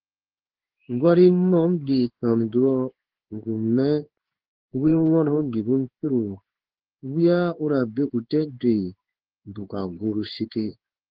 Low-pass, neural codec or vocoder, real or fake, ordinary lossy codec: 5.4 kHz; codec, 16 kHz in and 24 kHz out, 1 kbps, XY-Tokenizer; fake; Opus, 16 kbps